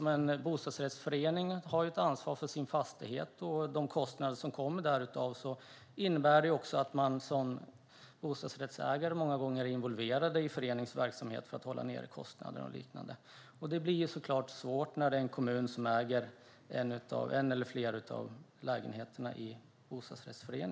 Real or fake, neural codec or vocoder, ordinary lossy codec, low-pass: real; none; none; none